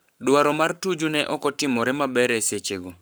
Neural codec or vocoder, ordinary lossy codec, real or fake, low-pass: codec, 44.1 kHz, 7.8 kbps, Pupu-Codec; none; fake; none